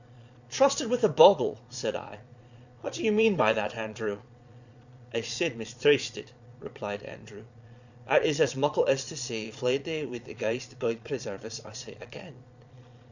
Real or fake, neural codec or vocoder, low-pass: fake; vocoder, 22.05 kHz, 80 mel bands, Vocos; 7.2 kHz